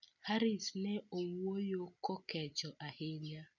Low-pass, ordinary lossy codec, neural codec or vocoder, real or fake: 7.2 kHz; none; none; real